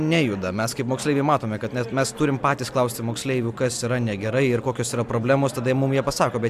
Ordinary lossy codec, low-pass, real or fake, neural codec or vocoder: AAC, 96 kbps; 14.4 kHz; real; none